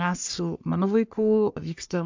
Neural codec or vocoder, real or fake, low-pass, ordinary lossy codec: codec, 44.1 kHz, 3.4 kbps, Pupu-Codec; fake; 7.2 kHz; MP3, 64 kbps